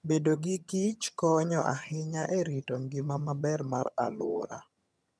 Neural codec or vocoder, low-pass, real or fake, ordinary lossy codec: vocoder, 22.05 kHz, 80 mel bands, HiFi-GAN; none; fake; none